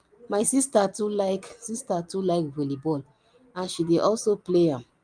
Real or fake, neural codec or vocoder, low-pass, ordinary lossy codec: real; none; 9.9 kHz; Opus, 32 kbps